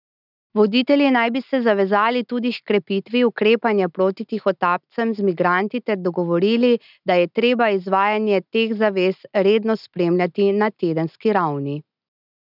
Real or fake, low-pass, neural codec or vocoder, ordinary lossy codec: real; 5.4 kHz; none; none